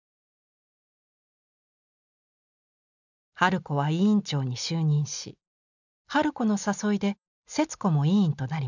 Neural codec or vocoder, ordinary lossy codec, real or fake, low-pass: none; none; real; 7.2 kHz